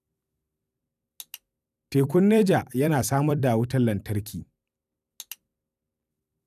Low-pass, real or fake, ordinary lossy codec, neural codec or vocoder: 14.4 kHz; fake; none; vocoder, 44.1 kHz, 128 mel bands every 256 samples, BigVGAN v2